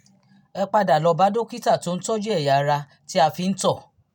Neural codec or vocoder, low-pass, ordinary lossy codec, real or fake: none; 19.8 kHz; none; real